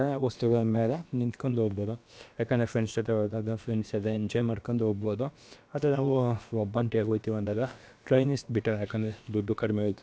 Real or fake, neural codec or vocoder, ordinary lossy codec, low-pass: fake; codec, 16 kHz, about 1 kbps, DyCAST, with the encoder's durations; none; none